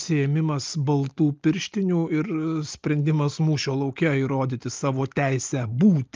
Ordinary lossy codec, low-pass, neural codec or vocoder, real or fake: Opus, 24 kbps; 7.2 kHz; none; real